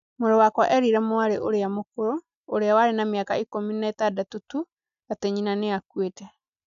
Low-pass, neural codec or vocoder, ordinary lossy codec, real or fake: 7.2 kHz; none; none; real